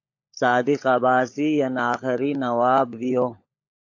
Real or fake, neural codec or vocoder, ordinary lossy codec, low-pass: fake; codec, 16 kHz, 16 kbps, FunCodec, trained on LibriTTS, 50 frames a second; AAC, 48 kbps; 7.2 kHz